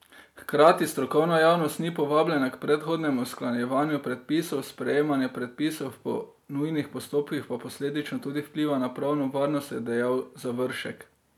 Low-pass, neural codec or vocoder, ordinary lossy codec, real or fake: 19.8 kHz; none; none; real